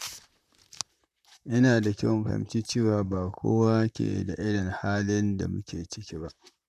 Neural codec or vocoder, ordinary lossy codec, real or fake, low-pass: vocoder, 44.1 kHz, 128 mel bands, Pupu-Vocoder; none; fake; 14.4 kHz